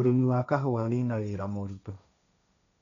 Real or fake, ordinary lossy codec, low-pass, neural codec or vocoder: fake; none; 7.2 kHz; codec, 16 kHz, 1.1 kbps, Voila-Tokenizer